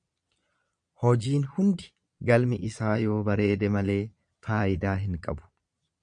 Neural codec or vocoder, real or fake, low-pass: vocoder, 22.05 kHz, 80 mel bands, Vocos; fake; 9.9 kHz